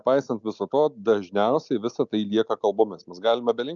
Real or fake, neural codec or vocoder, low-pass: real; none; 7.2 kHz